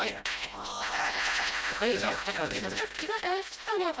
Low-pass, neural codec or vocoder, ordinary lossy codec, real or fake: none; codec, 16 kHz, 0.5 kbps, FreqCodec, smaller model; none; fake